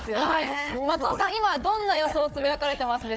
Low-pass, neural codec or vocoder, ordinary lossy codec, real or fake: none; codec, 16 kHz, 4 kbps, FunCodec, trained on Chinese and English, 50 frames a second; none; fake